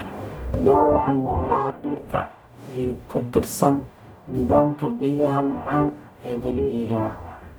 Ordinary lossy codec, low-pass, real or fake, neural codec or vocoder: none; none; fake; codec, 44.1 kHz, 0.9 kbps, DAC